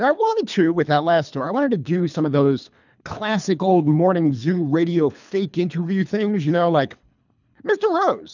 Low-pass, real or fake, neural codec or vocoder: 7.2 kHz; fake; codec, 24 kHz, 3 kbps, HILCodec